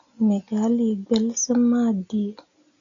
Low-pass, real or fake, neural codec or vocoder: 7.2 kHz; real; none